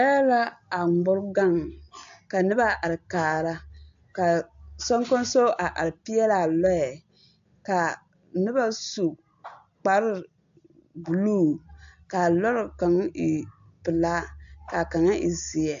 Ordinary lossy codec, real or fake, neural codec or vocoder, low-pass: AAC, 64 kbps; real; none; 7.2 kHz